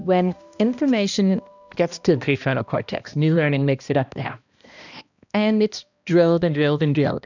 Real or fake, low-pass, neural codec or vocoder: fake; 7.2 kHz; codec, 16 kHz, 1 kbps, X-Codec, HuBERT features, trained on balanced general audio